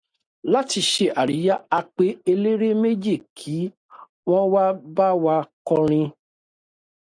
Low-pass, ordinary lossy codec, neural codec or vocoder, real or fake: 9.9 kHz; AAC, 64 kbps; none; real